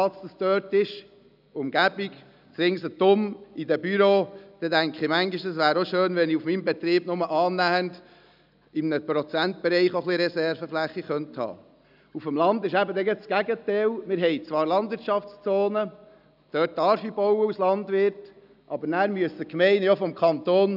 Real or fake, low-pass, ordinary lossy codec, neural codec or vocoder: real; 5.4 kHz; none; none